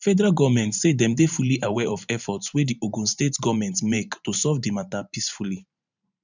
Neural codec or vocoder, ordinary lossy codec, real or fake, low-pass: vocoder, 44.1 kHz, 128 mel bands every 512 samples, BigVGAN v2; none; fake; 7.2 kHz